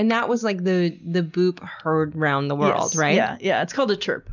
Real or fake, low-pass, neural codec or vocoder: real; 7.2 kHz; none